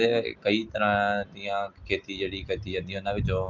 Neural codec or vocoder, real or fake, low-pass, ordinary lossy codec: none; real; 7.2 kHz; Opus, 32 kbps